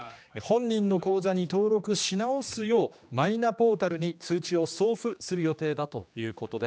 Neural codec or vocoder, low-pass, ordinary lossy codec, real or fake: codec, 16 kHz, 2 kbps, X-Codec, HuBERT features, trained on general audio; none; none; fake